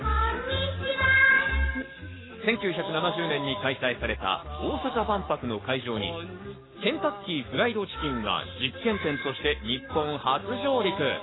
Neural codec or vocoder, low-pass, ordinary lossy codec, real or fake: codec, 44.1 kHz, 7.8 kbps, Pupu-Codec; 7.2 kHz; AAC, 16 kbps; fake